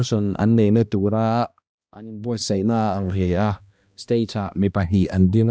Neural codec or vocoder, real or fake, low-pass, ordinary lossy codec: codec, 16 kHz, 1 kbps, X-Codec, HuBERT features, trained on balanced general audio; fake; none; none